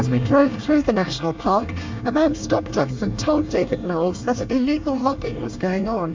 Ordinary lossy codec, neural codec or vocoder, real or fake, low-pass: MP3, 64 kbps; codec, 24 kHz, 1 kbps, SNAC; fake; 7.2 kHz